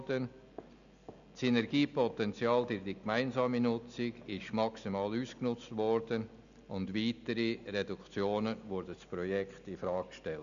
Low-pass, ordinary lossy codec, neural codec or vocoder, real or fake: 7.2 kHz; MP3, 96 kbps; none; real